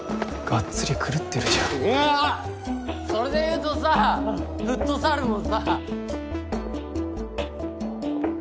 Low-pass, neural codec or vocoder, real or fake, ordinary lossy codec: none; none; real; none